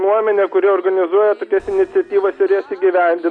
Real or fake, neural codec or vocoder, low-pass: real; none; 9.9 kHz